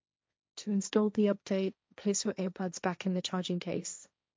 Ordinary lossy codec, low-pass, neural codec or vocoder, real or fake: none; none; codec, 16 kHz, 1.1 kbps, Voila-Tokenizer; fake